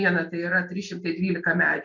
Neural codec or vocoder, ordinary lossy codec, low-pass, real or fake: none; MP3, 48 kbps; 7.2 kHz; real